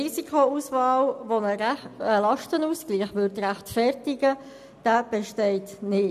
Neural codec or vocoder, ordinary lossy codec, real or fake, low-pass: none; none; real; 14.4 kHz